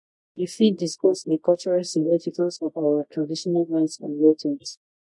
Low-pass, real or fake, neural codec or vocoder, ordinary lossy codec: 10.8 kHz; fake; codec, 24 kHz, 0.9 kbps, WavTokenizer, medium music audio release; MP3, 48 kbps